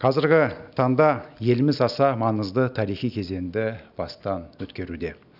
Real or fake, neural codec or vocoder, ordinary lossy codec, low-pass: real; none; none; 5.4 kHz